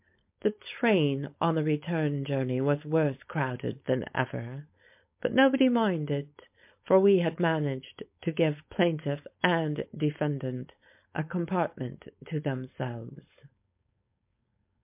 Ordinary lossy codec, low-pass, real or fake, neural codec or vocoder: MP3, 32 kbps; 3.6 kHz; fake; codec, 16 kHz, 4.8 kbps, FACodec